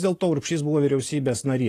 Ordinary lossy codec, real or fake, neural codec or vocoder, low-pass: AAC, 48 kbps; real; none; 14.4 kHz